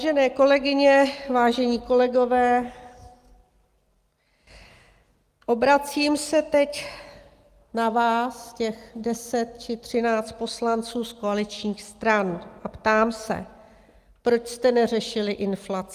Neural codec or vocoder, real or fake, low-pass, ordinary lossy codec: none; real; 14.4 kHz; Opus, 32 kbps